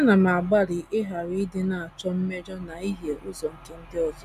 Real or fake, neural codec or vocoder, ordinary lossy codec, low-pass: real; none; none; 14.4 kHz